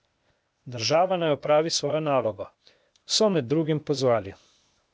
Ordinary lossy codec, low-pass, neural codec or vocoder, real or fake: none; none; codec, 16 kHz, 0.8 kbps, ZipCodec; fake